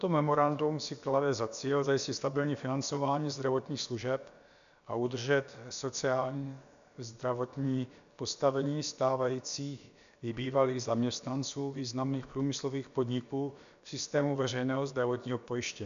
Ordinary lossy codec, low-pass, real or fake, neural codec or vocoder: AAC, 96 kbps; 7.2 kHz; fake; codec, 16 kHz, about 1 kbps, DyCAST, with the encoder's durations